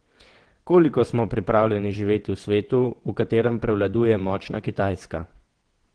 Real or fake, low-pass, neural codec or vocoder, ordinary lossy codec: fake; 9.9 kHz; vocoder, 22.05 kHz, 80 mel bands, WaveNeXt; Opus, 16 kbps